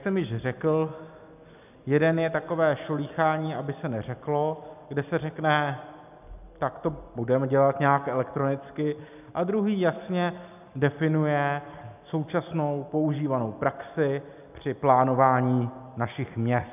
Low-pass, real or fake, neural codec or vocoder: 3.6 kHz; real; none